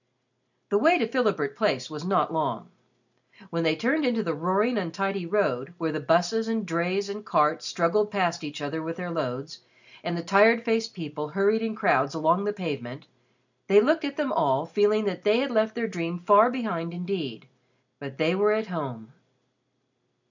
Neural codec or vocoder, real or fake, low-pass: none; real; 7.2 kHz